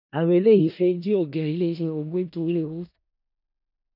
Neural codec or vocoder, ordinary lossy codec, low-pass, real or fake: codec, 16 kHz in and 24 kHz out, 0.4 kbps, LongCat-Audio-Codec, four codebook decoder; none; 5.4 kHz; fake